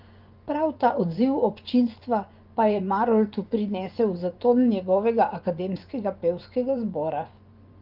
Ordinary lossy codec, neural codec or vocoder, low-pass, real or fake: Opus, 32 kbps; vocoder, 24 kHz, 100 mel bands, Vocos; 5.4 kHz; fake